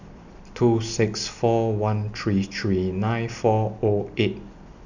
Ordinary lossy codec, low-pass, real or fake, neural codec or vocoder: none; 7.2 kHz; real; none